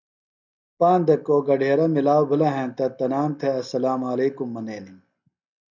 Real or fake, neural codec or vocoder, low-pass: real; none; 7.2 kHz